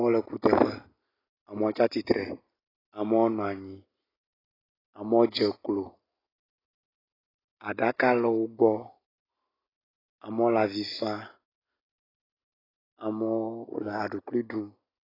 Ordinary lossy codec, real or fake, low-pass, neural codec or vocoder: AAC, 24 kbps; real; 5.4 kHz; none